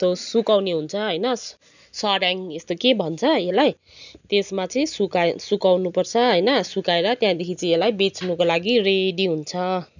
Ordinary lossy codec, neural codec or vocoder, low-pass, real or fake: none; none; 7.2 kHz; real